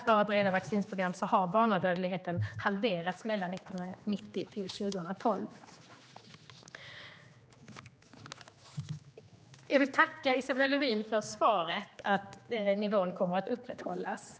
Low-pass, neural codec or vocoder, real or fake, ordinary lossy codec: none; codec, 16 kHz, 2 kbps, X-Codec, HuBERT features, trained on general audio; fake; none